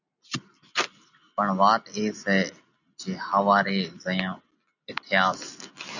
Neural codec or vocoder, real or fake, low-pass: none; real; 7.2 kHz